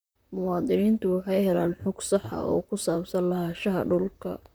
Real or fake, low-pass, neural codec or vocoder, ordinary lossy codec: fake; none; vocoder, 44.1 kHz, 128 mel bands, Pupu-Vocoder; none